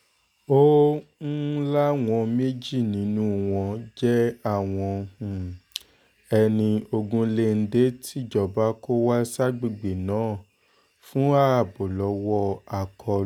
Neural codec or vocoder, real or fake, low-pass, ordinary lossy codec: none; real; 19.8 kHz; none